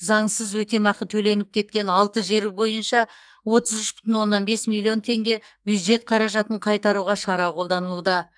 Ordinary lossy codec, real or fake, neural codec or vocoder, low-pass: none; fake; codec, 44.1 kHz, 2.6 kbps, SNAC; 9.9 kHz